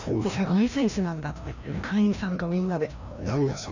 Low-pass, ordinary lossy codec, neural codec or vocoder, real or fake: 7.2 kHz; none; codec, 16 kHz, 1 kbps, FreqCodec, larger model; fake